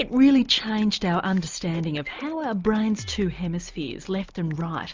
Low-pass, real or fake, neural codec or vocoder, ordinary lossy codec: 7.2 kHz; real; none; Opus, 32 kbps